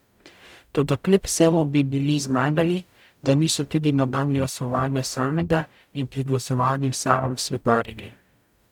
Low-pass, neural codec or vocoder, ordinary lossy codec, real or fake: 19.8 kHz; codec, 44.1 kHz, 0.9 kbps, DAC; none; fake